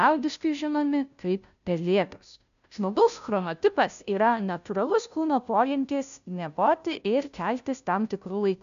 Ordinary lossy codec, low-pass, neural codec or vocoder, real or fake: AAC, 96 kbps; 7.2 kHz; codec, 16 kHz, 0.5 kbps, FunCodec, trained on Chinese and English, 25 frames a second; fake